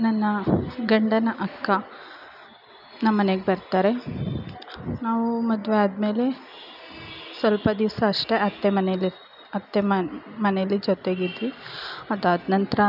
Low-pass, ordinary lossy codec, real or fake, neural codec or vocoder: 5.4 kHz; none; real; none